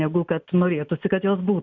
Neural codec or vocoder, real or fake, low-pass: none; real; 7.2 kHz